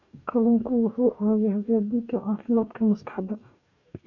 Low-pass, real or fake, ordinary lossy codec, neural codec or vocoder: 7.2 kHz; fake; none; codec, 44.1 kHz, 2.6 kbps, DAC